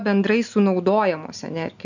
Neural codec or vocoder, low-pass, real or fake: none; 7.2 kHz; real